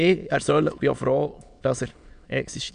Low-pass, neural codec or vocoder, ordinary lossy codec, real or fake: 9.9 kHz; autoencoder, 22.05 kHz, a latent of 192 numbers a frame, VITS, trained on many speakers; none; fake